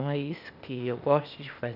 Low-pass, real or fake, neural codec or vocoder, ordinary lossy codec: 5.4 kHz; fake; codec, 16 kHz, 0.8 kbps, ZipCodec; none